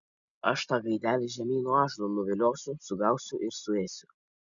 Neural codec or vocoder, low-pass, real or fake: none; 7.2 kHz; real